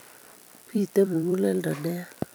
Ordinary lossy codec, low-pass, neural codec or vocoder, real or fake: none; none; vocoder, 44.1 kHz, 128 mel bands every 256 samples, BigVGAN v2; fake